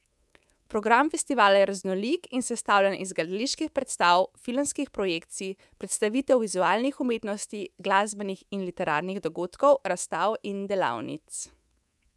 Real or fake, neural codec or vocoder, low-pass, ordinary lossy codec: fake; codec, 24 kHz, 3.1 kbps, DualCodec; none; none